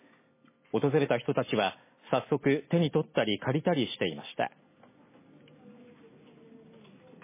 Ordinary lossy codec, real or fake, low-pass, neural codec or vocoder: MP3, 16 kbps; real; 3.6 kHz; none